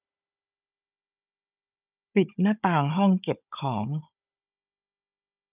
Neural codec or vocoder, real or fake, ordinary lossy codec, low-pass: codec, 16 kHz, 16 kbps, FunCodec, trained on Chinese and English, 50 frames a second; fake; none; 3.6 kHz